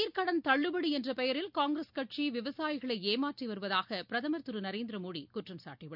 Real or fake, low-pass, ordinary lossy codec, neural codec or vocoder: real; 5.4 kHz; none; none